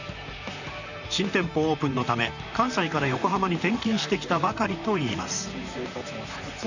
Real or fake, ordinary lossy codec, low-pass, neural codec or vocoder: fake; none; 7.2 kHz; vocoder, 44.1 kHz, 128 mel bands, Pupu-Vocoder